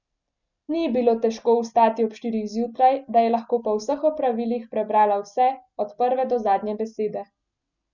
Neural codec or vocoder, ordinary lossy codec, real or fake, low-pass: none; none; real; 7.2 kHz